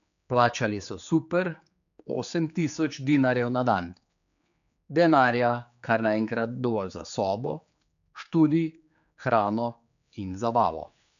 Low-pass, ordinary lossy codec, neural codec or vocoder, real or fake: 7.2 kHz; none; codec, 16 kHz, 4 kbps, X-Codec, HuBERT features, trained on general audio; fake